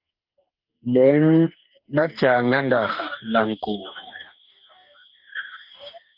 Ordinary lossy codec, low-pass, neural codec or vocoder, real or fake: Opus, 24 kbps; 5.4 kHz; codec, 44.1 kHz, 2.6 kbps, DAC; fake